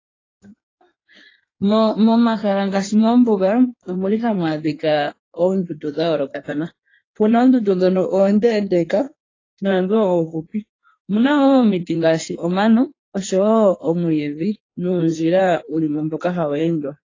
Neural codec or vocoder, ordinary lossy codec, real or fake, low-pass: codec, 16 kHz in and 24 kHz out, 1.1 kbps, FireRedTTS-2 codec; AAC, 32 kbps; fake; 7.2 kHz